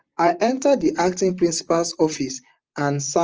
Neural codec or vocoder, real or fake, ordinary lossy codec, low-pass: vocoder, 44.1 kHz, 128 mel bands, Pupu-Vocoder; fake; Opus, 24 kbps; 7.2 kHz